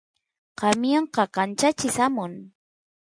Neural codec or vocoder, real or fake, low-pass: none; real; 9.9 kHz